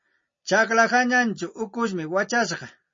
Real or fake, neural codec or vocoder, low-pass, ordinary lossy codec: real; none; 7.2 kHz; MP3, 32 kbps